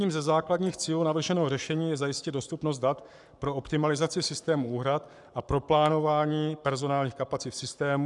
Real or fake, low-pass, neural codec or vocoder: fake; 10.8 kHz; codec, 44.1 kHz, 7.8 kbps, Pupu-Codec